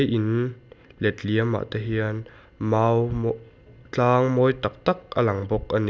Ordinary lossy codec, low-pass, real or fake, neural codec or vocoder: none; none; real; none